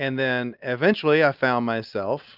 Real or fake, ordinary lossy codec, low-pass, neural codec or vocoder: real; Opus, 24 kbps; 5.4 kHz; none